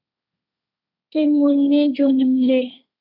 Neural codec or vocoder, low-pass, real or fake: codec, 16 kHz, 1.1 kbps, Voila-Tokenizer; 5.4 kHz; fake